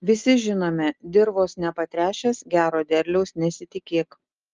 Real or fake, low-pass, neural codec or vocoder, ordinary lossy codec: real; 7.2 kHz; none; Opus, 24 kbps